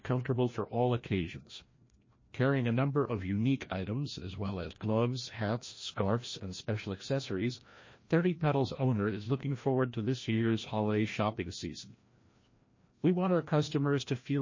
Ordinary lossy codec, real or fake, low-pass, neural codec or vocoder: MP3, 32 kbps; fake; 7.2 kHz; codec, 16 kHz, 1 kbps, FreqCodec, larger model